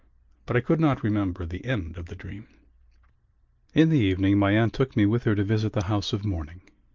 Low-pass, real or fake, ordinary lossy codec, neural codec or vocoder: 7.2 kHz; real; Opus, 24 kbps; none